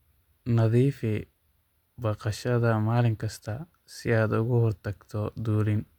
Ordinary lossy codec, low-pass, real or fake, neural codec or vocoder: MP3, 96 kbps; 19.8 kHz; real; none